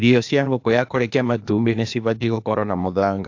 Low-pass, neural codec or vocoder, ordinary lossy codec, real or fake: 7.2 kHz; codec, 16 kHz, 0.8 kbps, ZipCodec; AAC, 48 kbps; fake